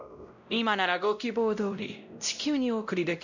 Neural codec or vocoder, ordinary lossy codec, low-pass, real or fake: codec, 16 kHz, 0.5 kbps, X-Codec, WavLM features, trained on Multilingual LibriSpeech; none; 7.2 kHz; fake